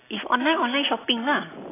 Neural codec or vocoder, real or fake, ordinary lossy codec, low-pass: none; real; AAC, 16 kbps; 3.6 kHz